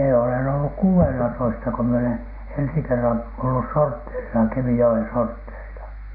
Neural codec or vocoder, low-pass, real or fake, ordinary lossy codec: none; 5.4 kHz; real; none